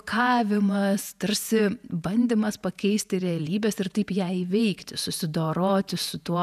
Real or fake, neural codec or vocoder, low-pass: fake; vocoder, 48 kHz, 128 mel bands, Vocos; 14.4 kHz